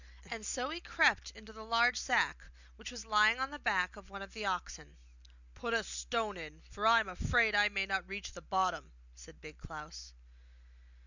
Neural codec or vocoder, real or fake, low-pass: none; real; 7.2 kHz